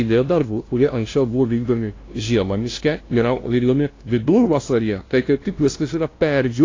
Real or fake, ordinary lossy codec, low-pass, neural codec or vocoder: fake; AAC, 32 kbps; 7.2 kHz; codec, 16 kHz, 0.5 kbps, FunCodec, trained on LibriTTS, 25 frames a second